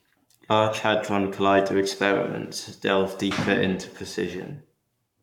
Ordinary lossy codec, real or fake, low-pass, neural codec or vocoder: none; fake; 19.8 kHz; codec, 44.1 kHz, 7.8 kbps, Pupu-Codec